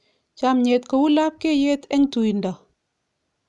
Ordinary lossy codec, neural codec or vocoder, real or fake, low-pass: none; none; real; 10.8 kHz